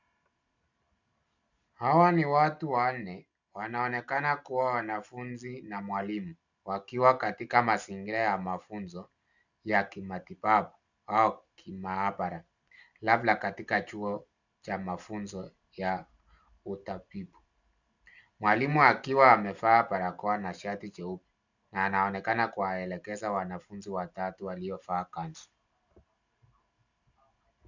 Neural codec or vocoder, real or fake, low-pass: none; real; 7.2 kHz